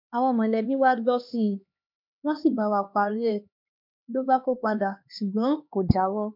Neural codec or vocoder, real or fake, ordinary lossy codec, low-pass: codec, 16 kHz, 2 kbps, X-Codec, HuBERT features, trained on LibriSpeech; fake; MP3, 48 kbps; 5.4 kHz